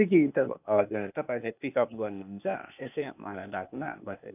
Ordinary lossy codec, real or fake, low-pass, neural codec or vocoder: none; fake; 3.6 kHz; codec, 16 kHz, 0.8 kbps, ZipCodec